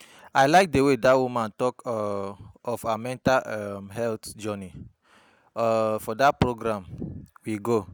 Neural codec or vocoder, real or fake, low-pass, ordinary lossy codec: none; real; none; none